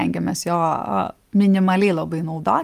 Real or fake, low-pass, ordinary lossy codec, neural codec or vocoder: real; 14.4 kHz; Opus, 32 kbps; none